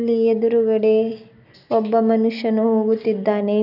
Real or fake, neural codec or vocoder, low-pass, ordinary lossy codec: real; none; 5.4 kHz; none